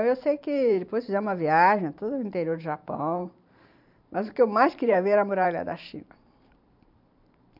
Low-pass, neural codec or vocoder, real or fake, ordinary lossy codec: 5.4 kHz; vocoder, 44.1 kHz, 128 mel bands every 512 samples, BigVGAN v2; fake; MP3, 48 kbps